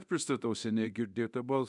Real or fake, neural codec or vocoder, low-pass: fake; codec, 24 kHz, 0.9 kbps, DualCodec; 10.8 kHz